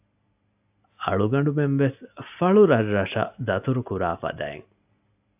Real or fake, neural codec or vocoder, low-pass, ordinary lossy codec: real; none; 3.6 kHz; AAC, 32 kbps